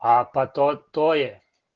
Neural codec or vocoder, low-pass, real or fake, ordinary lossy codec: none; 7.2 kHz; real; Opus, 16 kbps